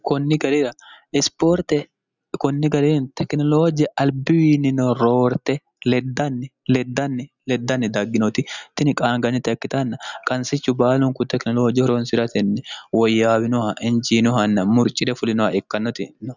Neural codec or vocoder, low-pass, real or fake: none; 7.2 kHz; real